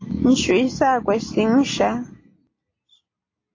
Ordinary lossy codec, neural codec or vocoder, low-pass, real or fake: AAC, 32 kbps; none; 7.2 kHz; real